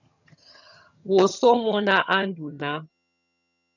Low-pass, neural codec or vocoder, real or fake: 7.2 kHz; vocoder, 22.05 kHz, 80 mel bands, HiFi-GAN; fake